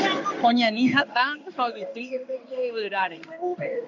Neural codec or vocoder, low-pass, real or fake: codec, 16 kHz in and 24 kHz out, 1 kbps, XY-Tokenizer; 7.2 kHz; fake